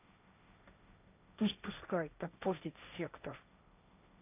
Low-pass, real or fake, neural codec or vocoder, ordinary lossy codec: 3.6 kHz; fake; codec, 16 kHz, 1.1 kbps, Voila-Tokenizer; none